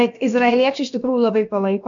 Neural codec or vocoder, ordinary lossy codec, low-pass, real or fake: codec, 16 kHz, about 1 kbps, DyCAST, with the encoder's durations; AAC, 64 kbps; 7.2 kHz; fake